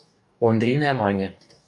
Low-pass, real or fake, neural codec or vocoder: 10.8 kHz; fake; codec, 44.1 kHz, 2.6 kbps, DAC